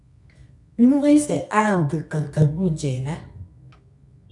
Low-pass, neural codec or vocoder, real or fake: 10.8 kHz; codec, 24 kHz, 0.9 kbps, WavTokenizer, medium music audio release; fake